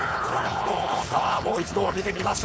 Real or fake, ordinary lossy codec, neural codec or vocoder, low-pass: fake; none; codec, 16 kHz, 4.8 kbps, FACodec; none